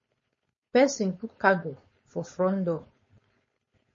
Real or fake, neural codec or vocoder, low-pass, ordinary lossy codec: fake; codec, 16 kHz, 4.8 kbps, FACodec; 7.2 kHz; MP3, 32 kbps